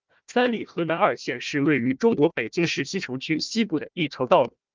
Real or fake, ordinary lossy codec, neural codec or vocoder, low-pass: fake; Opus, 24 kbps; codec, 16 kHz, 1 kbps, FunCodec, trained on Chinese and English, 50 frames a second; 7.2 kHz